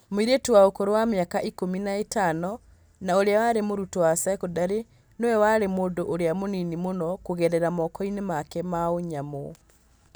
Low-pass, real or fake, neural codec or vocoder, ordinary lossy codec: none; real; none; none